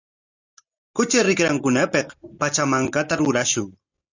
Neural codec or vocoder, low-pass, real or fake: none; 7.2 kHz; real